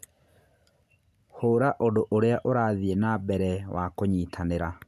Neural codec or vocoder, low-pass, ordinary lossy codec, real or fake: none; 14.4 kHz; MP3, 96 kbps; real